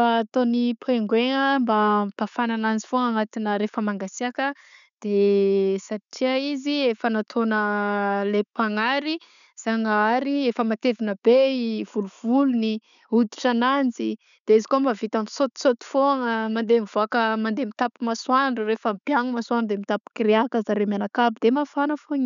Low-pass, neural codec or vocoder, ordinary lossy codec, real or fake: 7.2 kHz; none; none; real